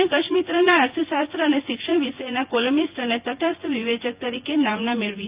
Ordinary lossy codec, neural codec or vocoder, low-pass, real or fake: Opus, 24 kbps; vocoder, 24 kHz, 100 mel bands, Vocos; 3.6 kHz; fake